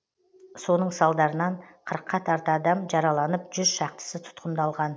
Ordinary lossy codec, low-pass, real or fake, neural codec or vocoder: none; none; real; none